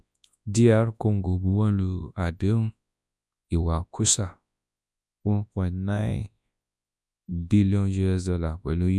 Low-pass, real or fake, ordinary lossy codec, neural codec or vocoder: none; fake; none; codec, 24 kHz, 0.9 kbps, WavTokenizer, large speech release